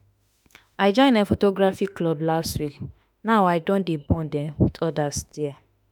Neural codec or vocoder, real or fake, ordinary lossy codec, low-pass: autoencoder, 48 kHz, 32 numbers a frame, DAC-VAE, trained on Japanese speech; fake; none; 19.8 kHz